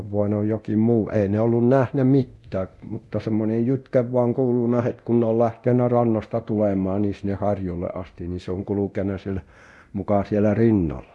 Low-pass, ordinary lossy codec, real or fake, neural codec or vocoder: none; none; fake; codec, 24 kHz, 0.9 kbps, DualCodec